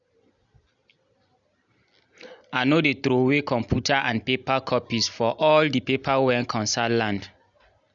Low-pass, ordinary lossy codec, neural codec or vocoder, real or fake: 7.2 kHz; none; none; real